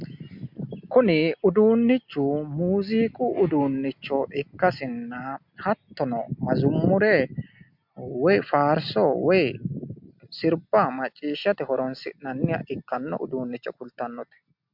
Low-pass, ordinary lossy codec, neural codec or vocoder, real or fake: 5.4 kHz; MP3, 48 kbps; none; real